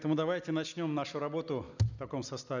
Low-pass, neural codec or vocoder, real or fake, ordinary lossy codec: 7.2 kHz; none; real; none